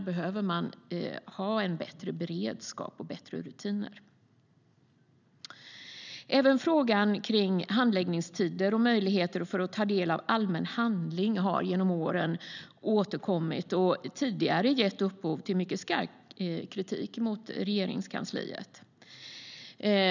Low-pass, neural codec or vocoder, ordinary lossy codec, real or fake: 7.2 kHz; none; none; real